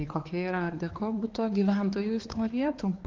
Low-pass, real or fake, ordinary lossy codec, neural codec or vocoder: 7.2 kHz; fake; Opus, 16 kbps; codec, 16 kHz, 4 kbps, X-Codec, HuBERT features, trained on balanced general audio